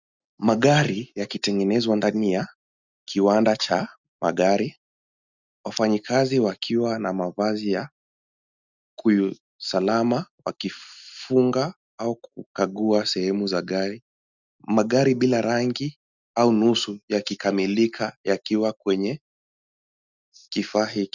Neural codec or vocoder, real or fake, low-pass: none; real; 7.2 kHz